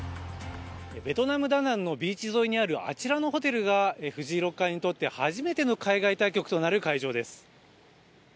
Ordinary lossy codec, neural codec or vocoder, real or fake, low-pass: none; none; real; none